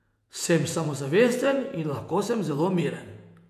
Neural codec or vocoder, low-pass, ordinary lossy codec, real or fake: vocoder, 48 kHz, 128 mel bands, Vocos; 14.4 kHz; MP3, 96 kbps; fake